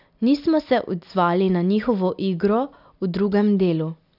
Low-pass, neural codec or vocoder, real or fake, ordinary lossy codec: 5.4 kHz; none; real; none